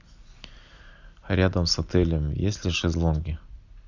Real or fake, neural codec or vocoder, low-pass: real; none; 7.2 kHz